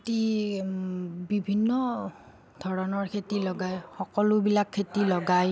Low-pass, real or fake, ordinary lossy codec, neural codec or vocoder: none; real; none; none